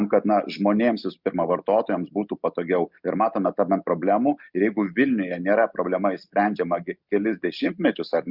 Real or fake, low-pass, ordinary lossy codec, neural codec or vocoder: real; 5.4 kHz; Opus, 64 kbps; none